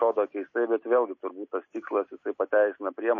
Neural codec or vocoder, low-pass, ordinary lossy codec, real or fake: none; 7.2 kHz; MP3, 32 kbps; real